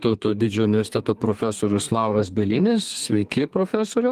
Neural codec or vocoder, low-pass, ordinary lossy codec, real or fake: codec, 32 kHz, 1.9 kbps, SNAC; 14.4 kHz; Opus, 24 kbps; fake